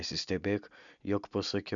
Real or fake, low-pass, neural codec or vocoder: real; 7.2 kHz; none